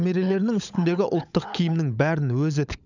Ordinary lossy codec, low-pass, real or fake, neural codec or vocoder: none; 7.2 kHz; fake; codec, 16 kHz, 16 kbps, FunCodec, trained on LibriTTS, 50 frames a second